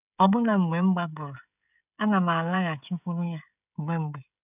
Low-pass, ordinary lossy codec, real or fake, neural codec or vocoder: 3.6 kHz; none; fake; codec, 16 kHz, 16 kbps, FreqCodec, smaller model